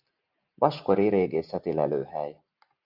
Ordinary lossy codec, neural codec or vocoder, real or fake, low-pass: Opus, 64 kbps; none; real; 5.4 kHz